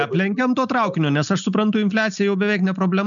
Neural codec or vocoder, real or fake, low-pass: none; real; 7.2 kHz